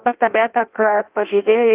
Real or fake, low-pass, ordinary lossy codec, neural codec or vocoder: fake; 3.6 kHz; Opus, 32 kbps; codec, 16 kHz in and 24 kHz out, 0.6 kbps, FireRedTTS-2 codec